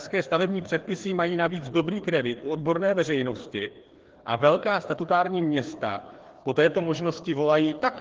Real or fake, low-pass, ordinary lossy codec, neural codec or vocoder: fake; 7.2 kHz; Opus, 16 kbps; codec, 16 kHz, 2 kbps, FreqCodec, larger model